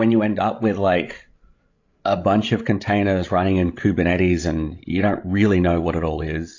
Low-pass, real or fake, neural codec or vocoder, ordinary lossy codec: 7.2 kHz; fake; codec, 16 kHz, 16 kbps, FreqCodec, larger model; AAC, 48 kbps